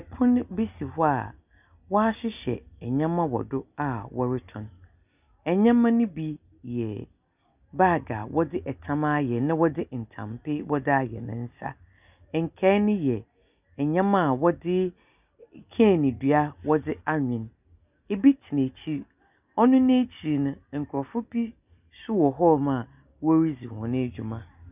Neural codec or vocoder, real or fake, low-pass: none; real; 3.6 kHz